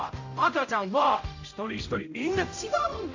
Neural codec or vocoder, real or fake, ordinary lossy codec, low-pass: codec, 16 kHz, 0.5 kbps, X-Codec, HuBERT features, trained on general audio; fake; AAC, 48 kbps; 7.2 kHz